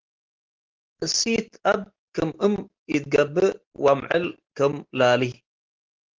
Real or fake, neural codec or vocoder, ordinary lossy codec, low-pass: real; none; Opus, 16 kbps; 7.2 kHz